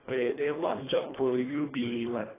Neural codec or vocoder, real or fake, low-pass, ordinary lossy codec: codec, 24 kHz, 1.5 kbps, HILCodec; fake; 3.6 kHz; AAC, 16 kbps